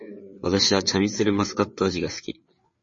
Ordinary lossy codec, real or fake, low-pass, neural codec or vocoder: MP3, 32 kbps; fake; 7.2 kHz; codec, 16 kHz, 4 kbps, FreqCodec, larger model